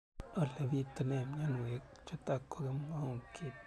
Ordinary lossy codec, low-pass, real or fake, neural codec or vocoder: none; none; real; none